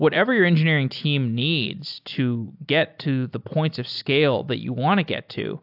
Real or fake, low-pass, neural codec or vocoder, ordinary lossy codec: real; 5.4 kHz; none; AAC, 48 kbps